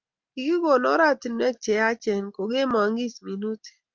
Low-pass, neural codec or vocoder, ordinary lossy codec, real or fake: 7.2 kHz; none; Opus, 24 kbps; real